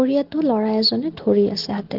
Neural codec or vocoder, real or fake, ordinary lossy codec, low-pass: none; real; Opus, 16 kbps; 5.4 kHz